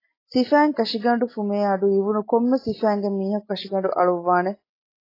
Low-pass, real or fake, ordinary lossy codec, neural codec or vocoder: 5.4 kHz; real; AAC, 24 kbps; none